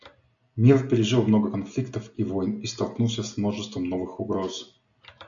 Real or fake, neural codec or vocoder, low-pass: real; none; 7.2 kHz